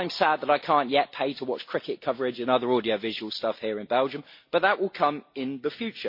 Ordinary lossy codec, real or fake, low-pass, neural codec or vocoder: MP3, 32 kbps; real; 5.4 kHz; none